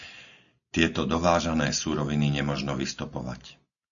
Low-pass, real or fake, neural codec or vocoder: 7.2 kHz; real; none